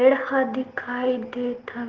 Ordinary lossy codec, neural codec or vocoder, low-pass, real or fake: Opus, 16 kbps; none; 7.2 kHz; real